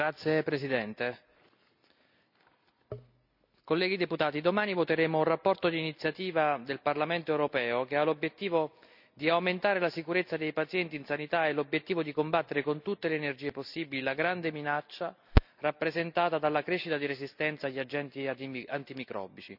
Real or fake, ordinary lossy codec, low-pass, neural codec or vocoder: real; none; 5.4 kHz; none